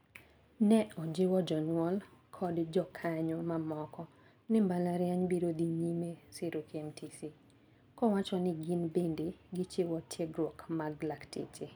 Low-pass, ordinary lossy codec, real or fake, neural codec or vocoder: none; none; real; none